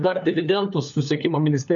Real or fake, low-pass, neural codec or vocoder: fake; 7.2 kHz; codec, 16 kHz, 4 kbps, FunCodec, trained on LibriTTS, 50 frames a second